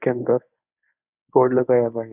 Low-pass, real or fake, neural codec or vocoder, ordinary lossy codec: 3.6 kHz; fake; codec, 24 kHz, 0.9 kbps, WavTokenizer, medium speech release version 1; none